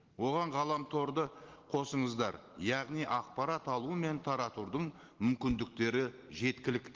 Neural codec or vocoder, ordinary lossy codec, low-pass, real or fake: none; Opus, 16 kbps; 7.2 kHz; real